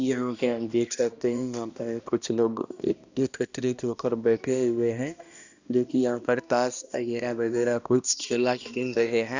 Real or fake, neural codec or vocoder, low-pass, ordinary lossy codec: fake; codec, 16 kHz, 1 kbps, X-Codec, HuBERT features, trained on balanced general audio; 7.2 kHz; Opus, 64 kbps